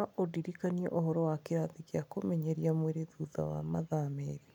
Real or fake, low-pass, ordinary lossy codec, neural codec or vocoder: real; 19.8 kHz; none; none